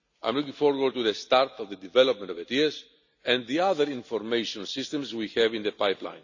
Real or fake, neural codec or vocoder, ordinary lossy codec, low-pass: real; none; none; 7.2 kHz